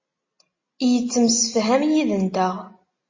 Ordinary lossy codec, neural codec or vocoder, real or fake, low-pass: MP3, 32 kbps; none; real; 7.2 kHz